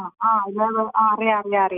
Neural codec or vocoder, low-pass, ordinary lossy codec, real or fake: none; 3.6 kHz; none; real